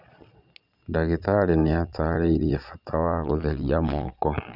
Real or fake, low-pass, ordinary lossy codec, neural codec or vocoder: fake; 5.4 kHz; none; vocoder, 22.05 kHz, 80 mel bands, Vocos